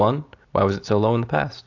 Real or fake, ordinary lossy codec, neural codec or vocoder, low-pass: real; AAC, 48 kbps; none; 7.2 kHz